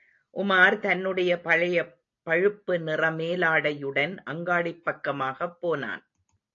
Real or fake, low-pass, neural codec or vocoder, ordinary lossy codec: real; 7.2 kHz; none; AAC, 48 kbps